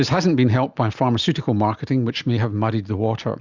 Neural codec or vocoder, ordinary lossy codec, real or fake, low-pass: none; Opus, 64 kbps; real; 7.2 kHz